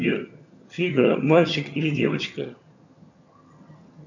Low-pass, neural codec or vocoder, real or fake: 7.2 kHz; vocoder, 22.05 kHz, 80 mel bands, HiFi-GAN; fake